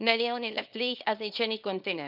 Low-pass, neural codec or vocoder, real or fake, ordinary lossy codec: 5.4 kHz; codec, 24 kHz, 0.9 kbps, WavTokenizer, small release; fake; none